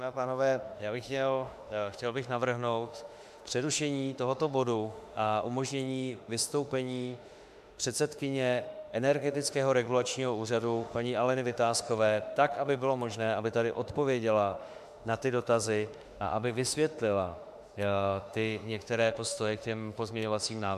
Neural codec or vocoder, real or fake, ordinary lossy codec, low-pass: autoencoder, 48 kHz, 32 numbers a frame, DAC-VAE, trained on Japanese speech; fake; MP3, 96 kbps; 14.4 kHz